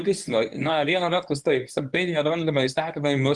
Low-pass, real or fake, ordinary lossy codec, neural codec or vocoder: 10.8 kHz; fake; Opus, 24 kbps; codec, 24 kHz, 0.9 kbps, WavTokenizer, medium speech release version 1